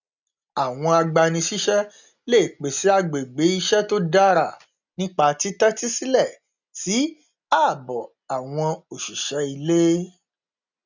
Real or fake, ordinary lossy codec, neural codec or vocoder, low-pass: real; none; none; 7.2 kHz